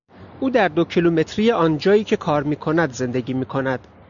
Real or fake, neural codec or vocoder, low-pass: real; none; 7.2 kHz